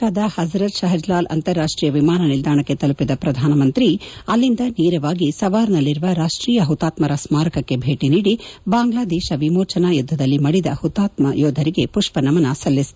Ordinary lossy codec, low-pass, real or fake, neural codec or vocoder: none; none; real; none